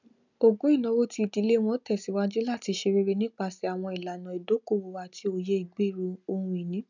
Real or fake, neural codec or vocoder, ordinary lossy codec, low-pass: real; none; none; 7.2 kHz